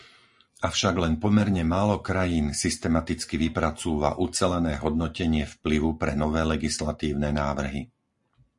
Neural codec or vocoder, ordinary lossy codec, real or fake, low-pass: none; MP3, 48 kbps; real; 10.8 kHz